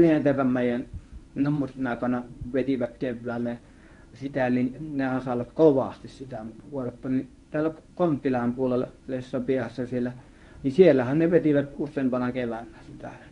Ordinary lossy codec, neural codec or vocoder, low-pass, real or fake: none; codec, 24 kHz, 0.9 kbps, WavTokenizer, medium speech release version 1; 10.8 kHz; fake